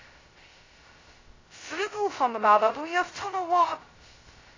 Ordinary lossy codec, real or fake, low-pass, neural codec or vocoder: AAC, 32 kbps; fake; 7.2 kHz; codec, 16 kHz, 0.2 kbps, FocalCodec